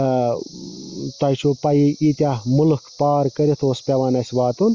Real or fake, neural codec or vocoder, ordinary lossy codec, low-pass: real; none; Opus, 32 kbps; 7.2 kHz